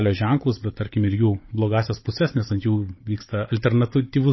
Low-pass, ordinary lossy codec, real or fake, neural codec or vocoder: 7.2 kHz; MP3, 24 kbps; fake; codec, 16 kHz, 16 kbps, FunCodec, trained on Chinese and English, 50 frames a second